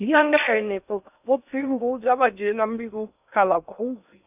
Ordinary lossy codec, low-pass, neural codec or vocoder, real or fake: none; 3.6 kHz; codec, 16 kHz in and 24 kHz out, 0.6 kbps, FocalCodec, streaming, 2048 codes; fake